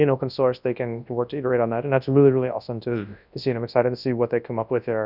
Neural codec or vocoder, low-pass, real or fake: codec, 24 kHz, 0.9 kbps, WavTokenizer, large speech release; 5.4 kHz; fake